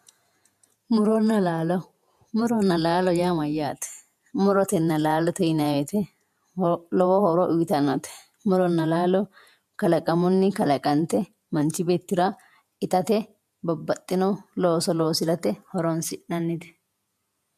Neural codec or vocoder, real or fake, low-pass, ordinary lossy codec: vocoder, 48 kHz, 128 mel bands, Vocos; fake; 14.4 kHz; MP3, 96 kbps